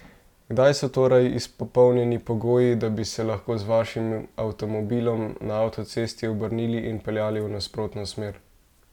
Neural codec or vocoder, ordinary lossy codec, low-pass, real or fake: none; none; 19.8 kHz; real